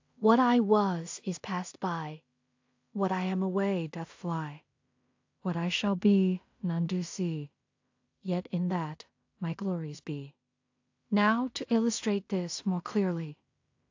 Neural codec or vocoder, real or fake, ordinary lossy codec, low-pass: codec, 16 kHz in and 24 kHz out, 0.4 kbps, LongCat-Audio-Codec, two codebook decoder; fake; AAC, 48 kbps; 7.2 kHz